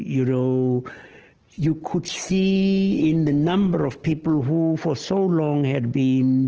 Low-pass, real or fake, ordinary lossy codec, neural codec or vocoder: 7.2 kHz; real; Opus, 16 kbps; none